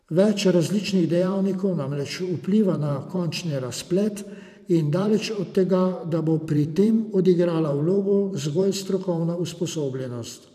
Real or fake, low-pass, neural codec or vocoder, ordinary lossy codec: fake; 14.4 kHz; vocoder, 44.1 kHz, 128 mel bands every 512 samples, BigVGAN v2; none